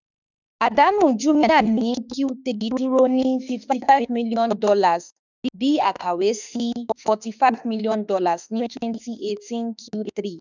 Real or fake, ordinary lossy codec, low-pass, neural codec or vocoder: fake; none; 7.2 kHz; autoencoder, 48 kHz, 32 numbers a frame, DAC-VAE, trained on Japanese speech